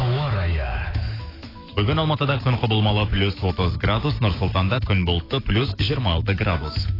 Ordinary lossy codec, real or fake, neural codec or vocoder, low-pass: AAC, 24 kbps; fake; autoencoder, 48 kHz, 128 numbers a frame, DAC-VAE, trained on Japanese speech; 5.4 kHz